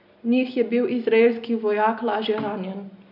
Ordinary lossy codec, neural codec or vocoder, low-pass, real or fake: none; none; 5.4 kHz; real